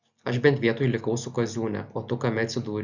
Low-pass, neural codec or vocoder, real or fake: 7.2 kHz; none; real